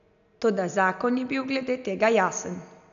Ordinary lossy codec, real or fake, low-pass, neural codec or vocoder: none; real; 7.2 kHz; none